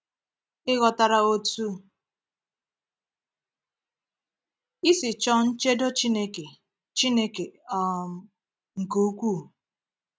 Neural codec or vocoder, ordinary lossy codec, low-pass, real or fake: none; none; none; real